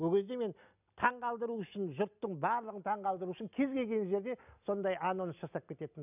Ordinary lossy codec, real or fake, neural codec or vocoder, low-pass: none; real; none; 3.6 kHz